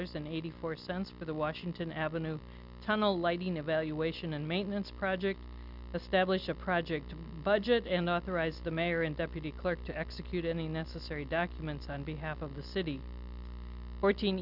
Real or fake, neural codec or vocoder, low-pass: real; none; 5.4 kHz